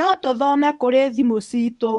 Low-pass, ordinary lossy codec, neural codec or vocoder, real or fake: 10.8 kHz; none; codec, 24 kHz, 0.9 kbps, WavTokenizer, medium speech release version 1; fake